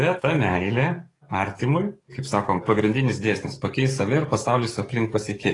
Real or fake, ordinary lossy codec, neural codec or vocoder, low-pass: fake; AAC, 32 kbps; codec, 44.1 kHz, 7.8 kbps, DAC; 10.8 kHz